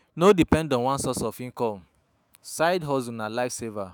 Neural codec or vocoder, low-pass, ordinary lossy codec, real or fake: autoencoder, 48 kHz, 128 numbers a frame, DAC-VAE, trained on Japanese speech; none; none; fake